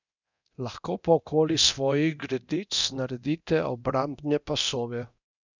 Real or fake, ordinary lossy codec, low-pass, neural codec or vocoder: fake; none; 7.2 kHz; codec, 24 kHz, 0.9 kbps, DualCodec